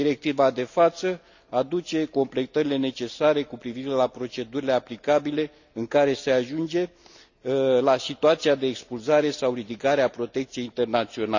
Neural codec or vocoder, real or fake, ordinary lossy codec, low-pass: none; real; none; 7.2 kHz